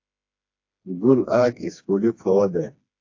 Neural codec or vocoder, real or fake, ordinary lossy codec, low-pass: codec, 16 kHz, 2 kbps, FreqCodec, smaller model; fake; AAC, 48 kbps; 7.2 kHz